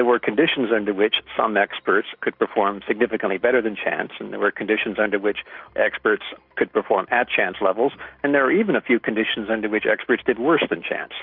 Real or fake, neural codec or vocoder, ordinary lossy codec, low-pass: real; none; Opus, 64 kbps; 5.4 kHz